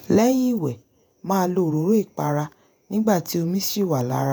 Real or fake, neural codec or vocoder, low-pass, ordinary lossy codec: fake; vocoder, 48 kHz, 128 mel bands, Vocos; none; none